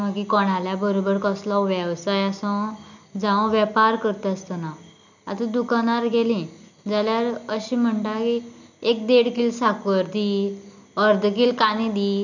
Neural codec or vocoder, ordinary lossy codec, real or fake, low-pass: none; none; real; 7.2 kHz